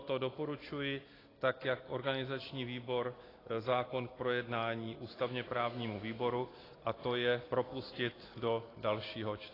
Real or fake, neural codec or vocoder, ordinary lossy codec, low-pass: real; none; AAC, 24 kbps; 5.4 kHz